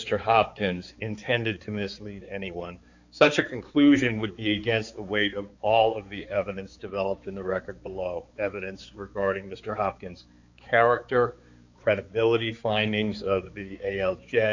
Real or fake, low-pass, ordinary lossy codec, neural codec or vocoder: fake; 7.2 kHz; Opus, 64 kbps; codec, 16 kHz, 4 kbps, X-Codec, HuBERT features, trained on balanced general audio